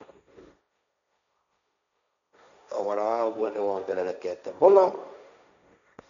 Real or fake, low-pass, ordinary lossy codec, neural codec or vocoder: fake; 7.2 kHz; none; codec, 16 kHz, 1.1 kbps, Voila-Tokenizer